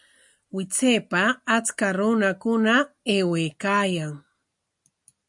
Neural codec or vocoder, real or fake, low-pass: none; real; 10.8 kHz